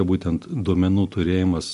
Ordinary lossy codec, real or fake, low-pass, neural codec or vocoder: MP3, 64 kbps; real; 10.8 kHz; none